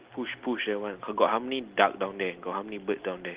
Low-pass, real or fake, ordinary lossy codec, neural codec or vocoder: 3.6 kHz; real; Opus, 16 kbps; none